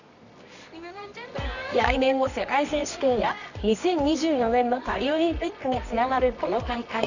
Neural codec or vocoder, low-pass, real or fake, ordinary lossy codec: codec, 24 kHz, 0.9 kbps, WavTokenizer, medium music audio release; 7.2 kHz; fake; none